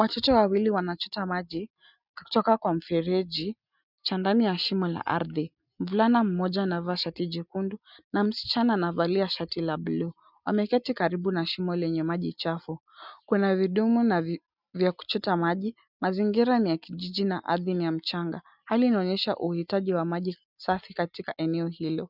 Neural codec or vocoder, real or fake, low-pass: none; real; 5.4 kHz